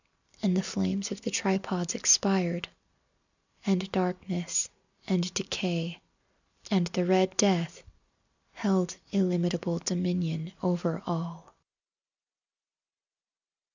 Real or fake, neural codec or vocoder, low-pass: real; none; 7.2 kHz